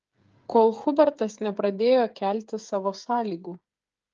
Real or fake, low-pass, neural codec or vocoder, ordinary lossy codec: fake; 7.2 kHz; codec, 16 kHz, 8 kbps, FreqCodec, smaller model; Opus, 24 kbps